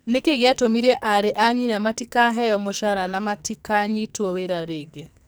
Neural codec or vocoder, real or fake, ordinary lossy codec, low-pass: codec, 44.1 kHz, 2.6 kbps, SNAC; fake; none; none